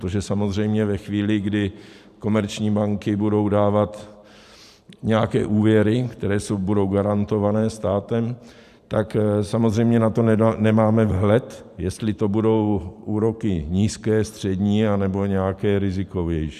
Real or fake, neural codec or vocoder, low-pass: fake; vocoder, 44.1 kHz, 128 mel bands every 512 samples, BigVGAN v2; 14.4 kHz